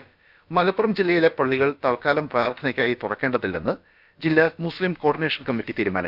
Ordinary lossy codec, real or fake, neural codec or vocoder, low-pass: none; fake; codec, 16 kHz, about 1 kbps, DyCAST, with the encoder's durations; 5.4 kHz